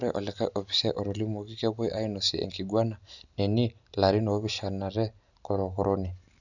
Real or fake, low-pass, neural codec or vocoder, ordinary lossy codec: real; 7.2 kHz; none; none